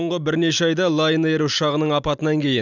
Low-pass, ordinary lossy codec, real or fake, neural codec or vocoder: 7.2 kHz; none; real; none